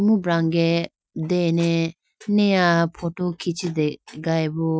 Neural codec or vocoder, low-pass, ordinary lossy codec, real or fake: none; none; none; real